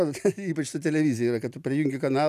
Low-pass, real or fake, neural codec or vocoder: 14.4 kHz; real; none